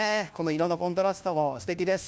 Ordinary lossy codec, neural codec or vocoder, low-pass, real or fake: none; codec, 16 kHz, 1 kbps, FunCodec, trained on LibriTTS, 50 frames a second; none; fake